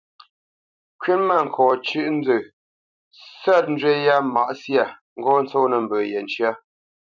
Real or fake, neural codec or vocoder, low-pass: fake; vocoder, 44.1 kHz, 128 mel bands every 256 samples, BigVGAN v2; 7.2 kHz